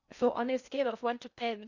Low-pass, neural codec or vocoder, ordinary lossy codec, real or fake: 7.2 kHz; codec, 16 kHz in and 24 kHz out, 0.6 kbps, FocalCodec, streaming, 2048 codes; none; fake